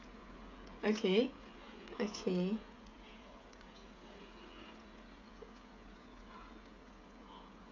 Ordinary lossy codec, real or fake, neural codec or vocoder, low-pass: AAC, 48 kbps; fake; codec, 16 kHz, 16 kbps, FreqCodec, smaller model; 7.2 kHz